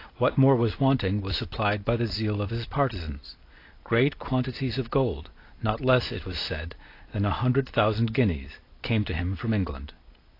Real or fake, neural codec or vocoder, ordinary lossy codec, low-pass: real; none; AAC, 32 kbps; 5.4 kHz